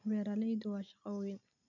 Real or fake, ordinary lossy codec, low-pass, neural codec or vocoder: real; none; 7.2 kHz; none